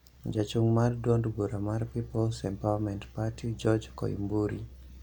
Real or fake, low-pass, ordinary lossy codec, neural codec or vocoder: real; 19.8 kHz; none; none